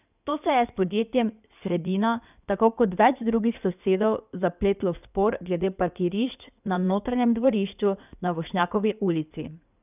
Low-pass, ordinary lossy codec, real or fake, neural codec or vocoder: 3.6 kHz; none; fake; codec, 16 kHz in and 24 kHz out, 2.2 kbps, FireRedTTS-2 codec